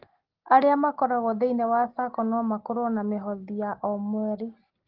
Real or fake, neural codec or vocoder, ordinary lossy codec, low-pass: real; none; Opus, 16 kbps; 5.4 kHz